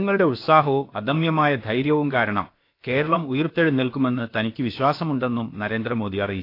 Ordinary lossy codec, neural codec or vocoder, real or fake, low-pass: AAC, 32 kbps; codec, 16 kHz, about 1 kbps, DyCAST, with the encoder's durations; fake; 5.4 kHz